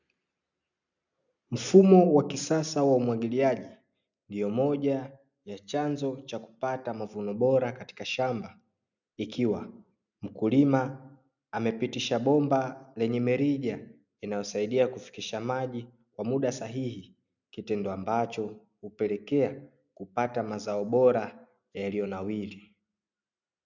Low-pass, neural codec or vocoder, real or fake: 7.2 kHz; none; real